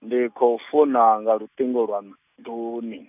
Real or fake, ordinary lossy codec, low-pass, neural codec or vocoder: real; none; 3.6 kHz; none